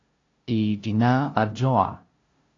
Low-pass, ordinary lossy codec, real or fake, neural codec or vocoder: 7.2 kHz; AAC, 32 kbps; fake; codec, 16 kHz, 0.5 kbps, FunCodec, trained on LibriTTS, 25 frames a second